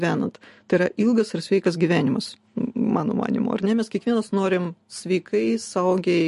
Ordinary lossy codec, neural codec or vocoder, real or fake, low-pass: MP3, 48 kbps; none; real; 14.4 kHz